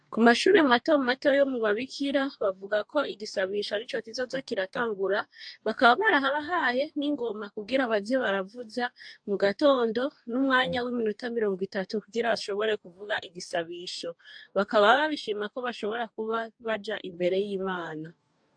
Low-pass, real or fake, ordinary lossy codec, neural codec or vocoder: 9.9 kHz; fake; AAC, 64 kbps; codec, 44.1 kHz, 2.6 kbps, DAC